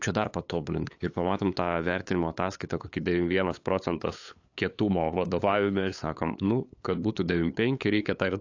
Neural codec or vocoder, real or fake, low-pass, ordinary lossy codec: codec, 16 kHz, 8 kbps, FunCodec, trained on LibriTTS, 25 frames a second; fake; 7.2 kHz; AAC, 48 kbps